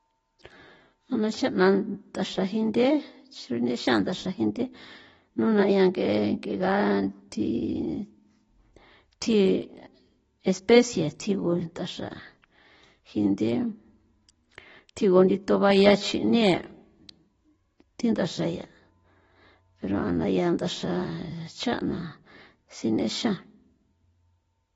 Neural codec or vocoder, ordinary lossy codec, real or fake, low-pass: none; AAC, 24 kbps; real; 19.8 kHz